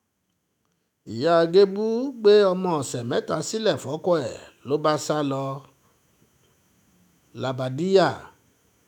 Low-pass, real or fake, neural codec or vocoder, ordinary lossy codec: 19.8 kHz; fake; autoencoder, 48 kHz, 128 numbers a frame, DAC-VAE, trained on Japanese speech; none